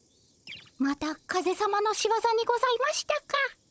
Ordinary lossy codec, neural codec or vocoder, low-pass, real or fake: none; codec, 16 kHz, 16 kbps, FunCodec, trained on Chinese and English, 50 frames a second; none; fake